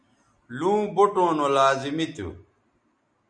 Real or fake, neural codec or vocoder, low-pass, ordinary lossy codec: real; none; 9.9 kHz; MP3, 64 kbps